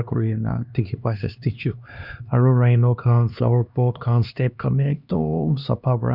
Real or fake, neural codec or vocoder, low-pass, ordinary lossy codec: fake; codec, 16 kHz, 2 kbps, X-Codec, HuBERT features, trained on LibriSpeech; 5.4 kHz; Opus, 64 kbps